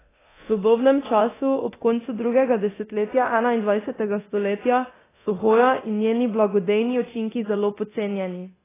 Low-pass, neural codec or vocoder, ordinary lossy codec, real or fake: 3.6 kHz; codec, 24 kHz, 0.9 kbps, DualCodec; AAC, 16 kbps; fake